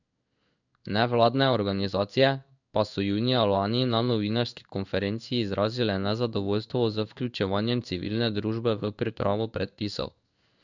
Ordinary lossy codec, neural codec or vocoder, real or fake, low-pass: none; codec, 16 kHz in and 24 kHz out, 1 kbps, XY-Tokenizer; fake; 7.2 kHz